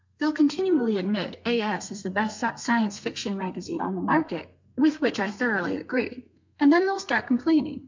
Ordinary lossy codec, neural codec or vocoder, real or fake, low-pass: MP3, 64 kbps; codec, 32 kHz, 1.9 kbps, SNAC; fake; 7.2 kHz